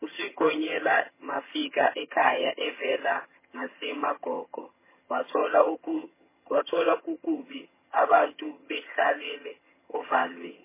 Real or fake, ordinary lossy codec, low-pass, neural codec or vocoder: fake; MP3, 16 kbps; 3.6 kHz; vocoder, 22.05 kHz, 80 mel bands, HiFi-GAN